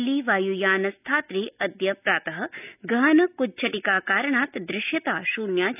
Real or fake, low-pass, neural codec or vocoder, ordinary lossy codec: real; 3.6 kHz; none; none